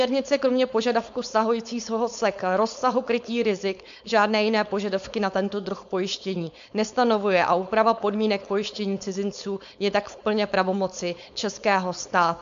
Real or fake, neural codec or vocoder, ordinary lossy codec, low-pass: fake; codec, 16 kHz, 4.8 kbps, FACodec; MP3, 64 kbps; 7.2 kHz